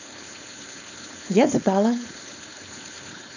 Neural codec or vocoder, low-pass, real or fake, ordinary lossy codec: codec, 16 kHz, 4.8 kbps, FACodec; 7.2 kHz; fake; none